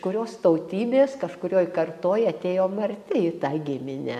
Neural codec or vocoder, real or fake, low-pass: none; real; 14.4 kHz